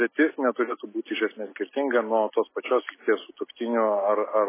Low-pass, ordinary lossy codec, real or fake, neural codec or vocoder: 3.6 kHz; MP3, 16 kbps; real; none